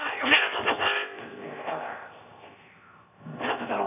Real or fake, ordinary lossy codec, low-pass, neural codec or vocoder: fake; none; 3.6 kHz; codec, 16 kHz, 0.7 kbps, FocalCodec